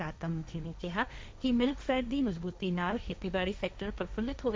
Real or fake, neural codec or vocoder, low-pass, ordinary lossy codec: fake; codec, 16 kHz, 1.1 kbps, Voila-Tokenizer; none; none